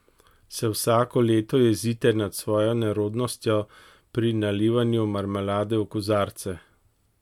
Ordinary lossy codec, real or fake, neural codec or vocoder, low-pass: MP3, 96 kbps; real; none; 19.8 kHz